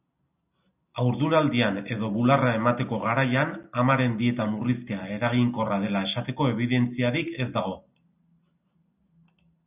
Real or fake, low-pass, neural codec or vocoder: real; 3.6 kHz; none